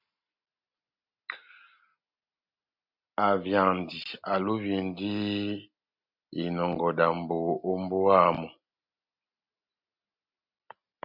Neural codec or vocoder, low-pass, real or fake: none; 5.4 kHz; real